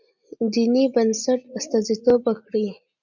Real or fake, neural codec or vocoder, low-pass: real; none; 7.2 kHz